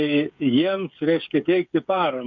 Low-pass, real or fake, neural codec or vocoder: 7.2 kHz; fake; codec, 16 kHz, 8 kbps, FreqCodec, smaller model